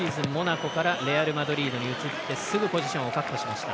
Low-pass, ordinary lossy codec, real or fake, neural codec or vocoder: none; none; real; none